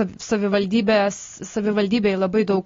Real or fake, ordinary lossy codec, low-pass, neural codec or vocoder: real; AAC, 32 kbps; 7.2 kHz; none